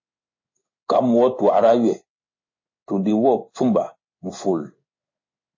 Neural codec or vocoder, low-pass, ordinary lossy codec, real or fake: codec, 16 kHz in and 24 kHz out, 1 kbps, XY-Tokenizer; 7.2 kHz; MP3, 32 kbps; fake